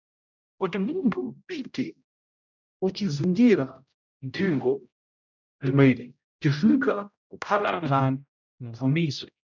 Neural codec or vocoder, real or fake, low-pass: codec, 16 kHz, 0.5 kbps, X-Codec, HuBERT features, trained on general audio; fake; 7.2 kHz